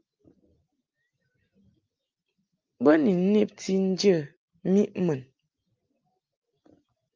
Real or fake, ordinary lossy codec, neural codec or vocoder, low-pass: real; Opus, 24 kbps; none; 7.2 kHz